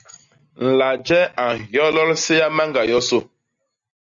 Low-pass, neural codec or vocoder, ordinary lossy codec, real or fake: 7.2 kHz; none; Opus, 64 kbps; real